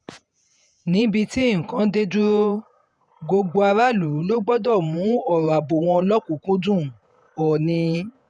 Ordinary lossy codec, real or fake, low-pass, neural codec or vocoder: none; fake; 9.9 kHz; vocoder, 48 kHz, 128 mel bands, Vocos